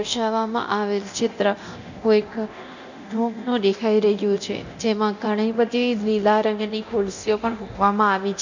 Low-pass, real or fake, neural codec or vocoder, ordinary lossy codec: 7.2 kHz; fake; codec, 24 kHz, 0.9 kbps, DualCodec; none